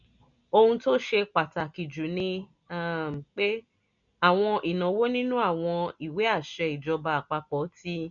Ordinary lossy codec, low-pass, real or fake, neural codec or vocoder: none; 7.2 kHz; real; none